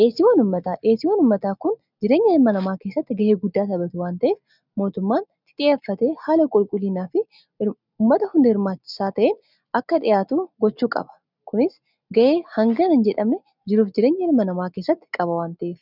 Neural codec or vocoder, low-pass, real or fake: none; 5.4 kHz; real